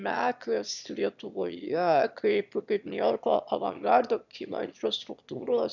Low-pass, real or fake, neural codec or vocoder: 7.2 kHz; fake; autoencoder, 22.05 kHz, a latent of 192 numbers a frame, VITS, trained on one speaker